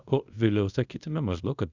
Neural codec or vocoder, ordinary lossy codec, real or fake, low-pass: codec, 24 kHz, 0.5 kbps, DualCodec; Opus, 64 kbps; fake; 7.2 kHz